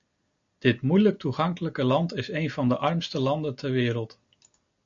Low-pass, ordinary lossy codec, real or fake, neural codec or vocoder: 7.2 kHz; MP3, 48 kbps; real; none